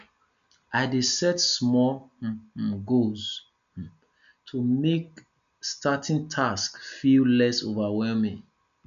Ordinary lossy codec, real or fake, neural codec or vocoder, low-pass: none; real; none; 7.2 kHz